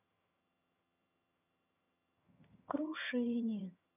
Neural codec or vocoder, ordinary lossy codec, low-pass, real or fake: vocoder, 22.05 kHz, 80 mel bands, HiFi-GAN; none; 3.6 kHz; fake